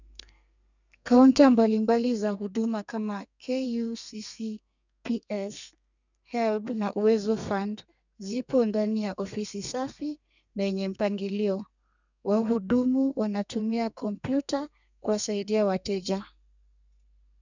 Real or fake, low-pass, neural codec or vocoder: fake; 7.2 kHz; codec, 32 kHz, 1.9 kbps, SNAC